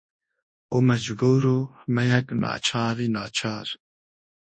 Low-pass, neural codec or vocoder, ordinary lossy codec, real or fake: 9.9 kHz; codec, 24 kHz, 0.9 kbps, WavTokenizer, large speech release; MP3, 32 kbps; fake